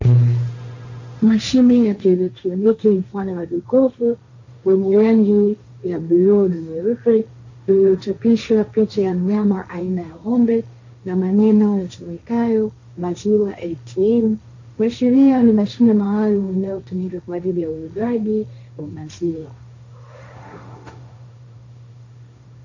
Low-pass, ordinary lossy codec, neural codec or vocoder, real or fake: 7.2 kHz; AAC, 48 kbps; codec, 16 kHz, 1.1 kbps, Voila-Tokenizer; fake